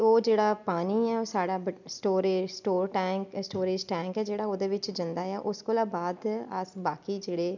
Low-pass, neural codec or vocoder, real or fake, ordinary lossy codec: 7.2 kHz; none; real; none